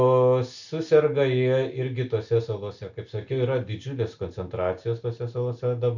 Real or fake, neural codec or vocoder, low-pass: real; none; 7.2 kHz